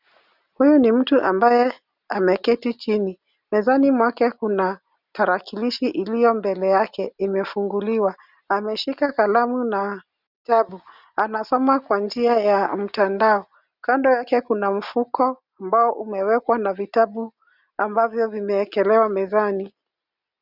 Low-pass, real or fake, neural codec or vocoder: 5.4 kHz; real; none